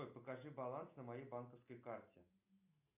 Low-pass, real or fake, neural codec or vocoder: 3.6 kHz; real; none